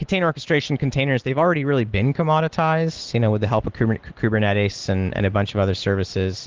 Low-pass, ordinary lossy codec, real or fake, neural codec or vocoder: 7.2 kHz; Opus, 16 kbps; real; none